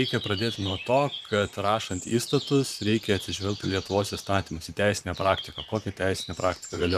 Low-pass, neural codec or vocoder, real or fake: 14.4 kHz; vocoder, 44.1 kHz, 128 mel bands, Pupu-Vocoder; fake